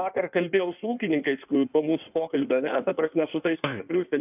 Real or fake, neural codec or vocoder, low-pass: fake; codec, 16 kHz in and 24 kHz out, 1.1 kbps, FireRedTTS-2 codec; 3.6 kHz